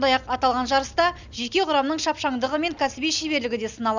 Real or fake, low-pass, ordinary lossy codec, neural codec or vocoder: real; 7.2 kHz; none; none